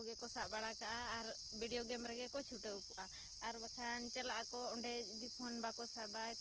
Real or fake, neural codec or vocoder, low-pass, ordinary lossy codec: real; none; 7.2 kHz; Opus, 16 kbps